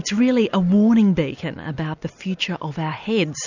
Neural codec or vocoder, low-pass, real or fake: none; 7.2 kHz; real